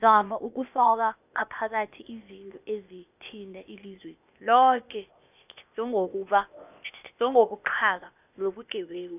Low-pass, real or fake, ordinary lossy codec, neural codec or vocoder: 3.6 kHz; fake; none; codec, 16 kHz, 0.8 kbps, ZipCodec